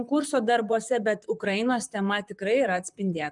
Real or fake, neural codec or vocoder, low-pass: fake; vocoder, 44.1 kHz, 128 mel bands, Pupu-Vocoder; 10.8 kHz